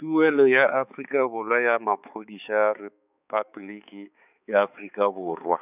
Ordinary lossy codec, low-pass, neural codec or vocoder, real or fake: none; 3.6 kHz; codec, 16 kHz, 4 kbps, X-Codec, HuBERT features, trained on balanced general audio; fake